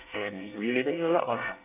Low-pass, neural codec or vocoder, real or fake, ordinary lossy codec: 3.6 kHz; codec, 24 kHz, 1 kbps, SNAC; fake; AAC, 24 kbps